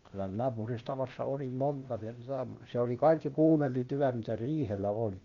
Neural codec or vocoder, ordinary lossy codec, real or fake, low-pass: codec, 16 kHz, 0.8 kbps, ZipCodec; Opus, 64 kbps; fake; 7.2 kHz